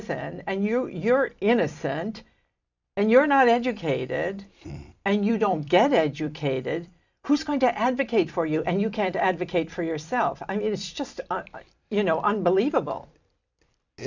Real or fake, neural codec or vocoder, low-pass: fake; vocoder, 44.1 kHz, 128 mel bands every 256 samples, BigVGAN v2; 7.2 kHz